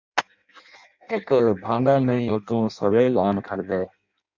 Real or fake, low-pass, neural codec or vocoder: fake; 7.2 kHz; codec, 16 kHz in and 24 kHz out, 0.6 kbps, FireRedTTS-2 codec